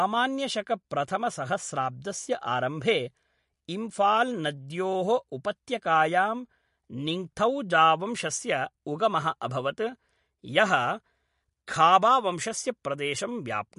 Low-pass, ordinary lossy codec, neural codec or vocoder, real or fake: 14.4 kHz; MP3, 48 kbps; none; real